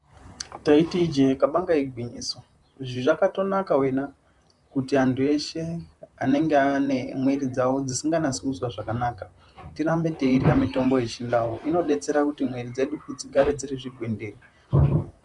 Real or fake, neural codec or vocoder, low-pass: fake; vocoder, 44.1 kHz, 128 mel bands, Pupu-Vocoder; 10.8 kHz